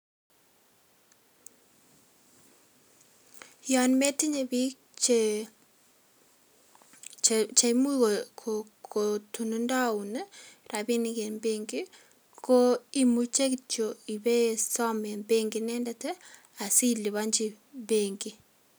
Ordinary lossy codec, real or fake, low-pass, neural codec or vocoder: none; real; none; none